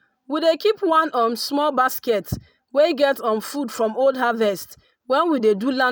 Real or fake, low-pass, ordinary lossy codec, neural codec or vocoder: real; none; none; none